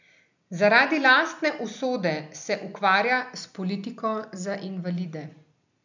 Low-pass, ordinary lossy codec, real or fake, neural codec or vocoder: 7.2 kHz; none; real; none